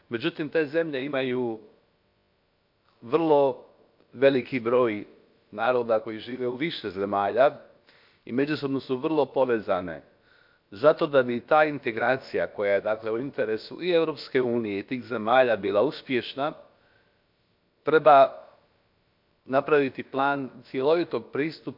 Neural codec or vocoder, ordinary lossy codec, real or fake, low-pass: codec, 16 kHz, about 1 kbps, DyCAST, with the encoder's durations; MP3, 48 kbps; fake; 5.4 kHz